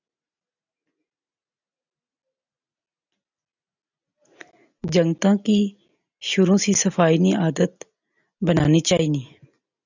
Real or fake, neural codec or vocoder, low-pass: real; none; 7.2 kHz